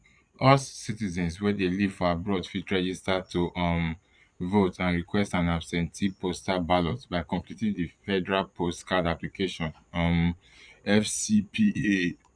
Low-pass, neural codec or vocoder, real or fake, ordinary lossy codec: 9.9 kHz; vocoder, 22.05 kHz, 80 mel bands, Vocos; fake; none